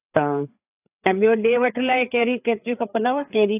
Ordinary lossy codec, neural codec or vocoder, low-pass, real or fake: AAC, 32 kbps; codec, 16 kHz, 4 kbps, FreqCodec, larger model; 3.6 kHz; fake